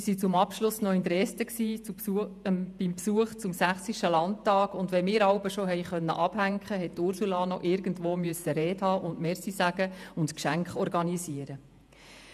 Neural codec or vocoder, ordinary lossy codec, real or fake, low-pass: vocoder, 48 kHz, 128 mel bands, Vocos; none; fake; 14.4 kHz